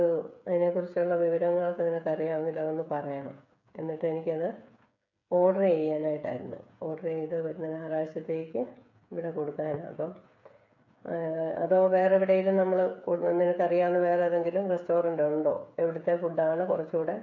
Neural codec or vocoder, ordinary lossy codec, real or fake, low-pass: codec, 16 kHz, 8 kbps, FreqCodec, smaller model; none; fake; 7.2 kHz